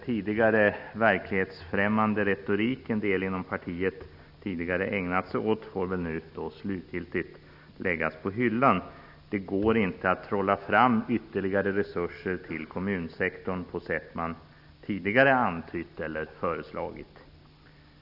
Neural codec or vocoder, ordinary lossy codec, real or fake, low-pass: none; none; real; 5.4 kHz